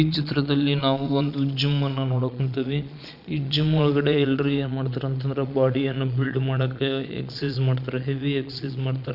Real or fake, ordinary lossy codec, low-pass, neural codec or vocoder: fake; none; 5.4 kHz; vocoder, 22.05 kHz, 80 mel bands, Vocos